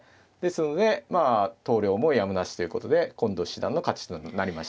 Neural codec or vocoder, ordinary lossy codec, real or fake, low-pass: none; none; real; none